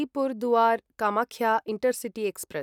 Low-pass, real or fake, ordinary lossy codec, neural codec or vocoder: 19.8 kHz; real; none; none